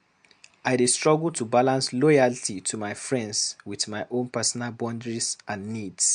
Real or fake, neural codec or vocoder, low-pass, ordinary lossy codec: real; none; 9.9 kHz; MP3, 64 kbps